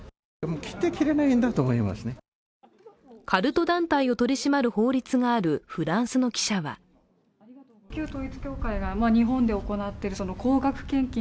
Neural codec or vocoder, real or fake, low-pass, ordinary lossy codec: none; real; none; none